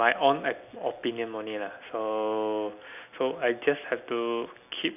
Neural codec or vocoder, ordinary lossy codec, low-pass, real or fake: none; none; 3.6 kHz; real